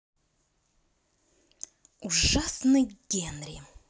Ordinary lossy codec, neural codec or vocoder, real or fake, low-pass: none; none; real; none